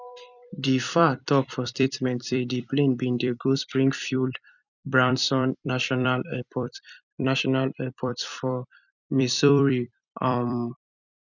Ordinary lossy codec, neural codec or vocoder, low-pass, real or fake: none; vocoder, 44.1 kHz, 128 mel bands every 256 samples, BigVGAN v2; 7.2 kHz; fake